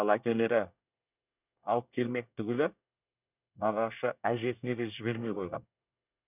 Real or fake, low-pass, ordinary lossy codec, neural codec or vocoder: fake; 3.6 kHz; none; codec, 24 kHz, 1 kbps, SNAC